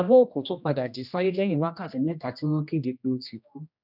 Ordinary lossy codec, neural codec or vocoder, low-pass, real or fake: none; codec, 16 kHz, 1 kbps, X-Codec, HuBERT features, trained on general audio; 5.4 kHz; fake